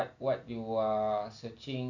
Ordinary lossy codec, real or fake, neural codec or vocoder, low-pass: none; real; none; 7.2 kHz